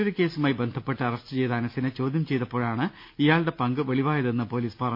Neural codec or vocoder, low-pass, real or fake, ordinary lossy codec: none; 5.4 kHz; real; MP3, 32 kbps